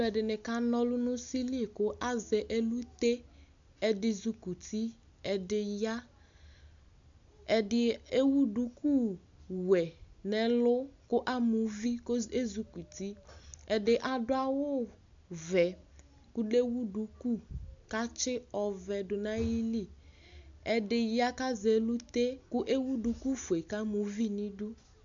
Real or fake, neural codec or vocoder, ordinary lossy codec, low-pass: real; none; MP3, 64 kbps; 7.2 kHz